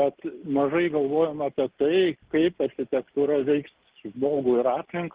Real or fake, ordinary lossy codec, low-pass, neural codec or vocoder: real; Opus, 16 kbps; 3.6 kHz; none